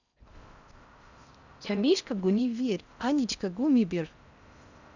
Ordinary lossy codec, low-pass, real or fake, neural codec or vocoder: none; 7.2 kHz; fake; codec, 16 kHz in and 24 kHz out, 0.6 kbps, FocalCodec, streaming, 4096 codes